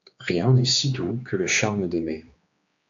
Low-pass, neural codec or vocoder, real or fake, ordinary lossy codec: 7.2 kHz; codec, 16 kHz, 2 kbps, X-Codec, HuBERT features, trained on general audio; fake; AAC, 64 kbps